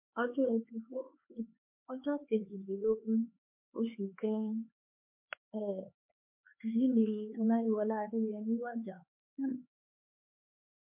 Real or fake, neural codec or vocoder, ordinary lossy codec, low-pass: fake; codec, 16 kHz, 4 kbps, X-Codec, HuBERT features, trained on LibriSpeech; MP3, 32 kbps; 3.6 kHz